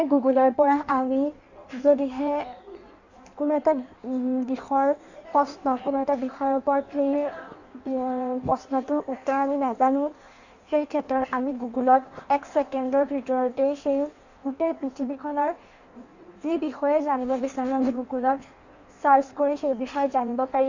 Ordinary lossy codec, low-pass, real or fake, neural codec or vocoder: none; 7.2 kHz; fake; codec, 16 kHz in and 24 kHz out, 1.1 kbps, FireRedTTS-2 codec